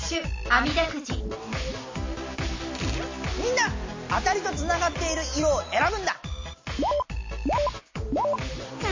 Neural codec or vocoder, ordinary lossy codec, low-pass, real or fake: none; MP3, 32 kbps; 7.2 kHz; real